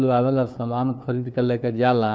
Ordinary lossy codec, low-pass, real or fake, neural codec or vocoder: none; none; fake; codec, 16 kHz, 2 kbps, FunCodec, trained on LibriTTS, 25 frames a second